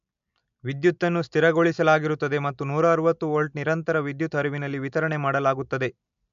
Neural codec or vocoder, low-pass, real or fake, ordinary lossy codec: none; 7.2 kHz; real; MP3, 64 kbps